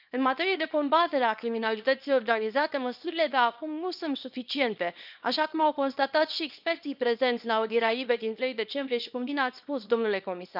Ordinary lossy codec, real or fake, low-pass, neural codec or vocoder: none; fake; 5.4 kHz; codec, 24 kHz, 0.9 kbps, WavTokenizer, small release